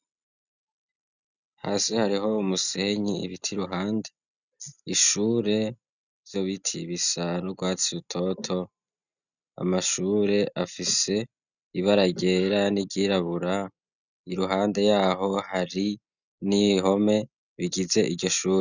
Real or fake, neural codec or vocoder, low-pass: real; none; 7.2 kHz